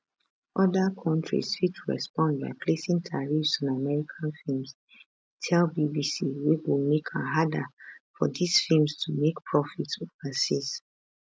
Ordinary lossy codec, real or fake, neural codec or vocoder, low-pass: none; real; none; none